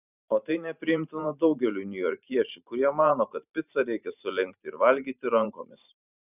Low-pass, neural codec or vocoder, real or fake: 3.6 kHz; vocoder, 44.1 kHz, 128 mel bands every 512 samples, BigVGAN v2; fake